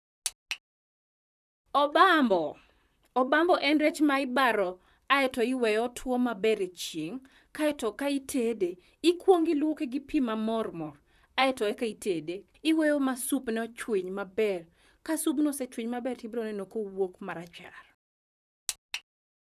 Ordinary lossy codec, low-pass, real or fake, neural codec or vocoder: none; 14.4 kHz; fake; vocoder, 44.1 kHz, 128 mel bands, Pupu-Vocoder